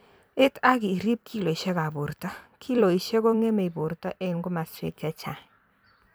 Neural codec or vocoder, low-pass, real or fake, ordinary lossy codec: vocoder, 44.1 kHz, 128 mel bands every 256 samples, BigVGAN v2; none; fake; none